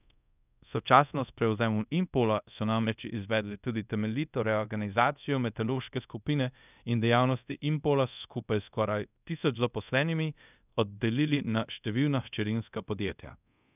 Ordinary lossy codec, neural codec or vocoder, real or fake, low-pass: none; codec, 24 kHz, 0.5 kbps, DualCodec; fake; 3.6 kHz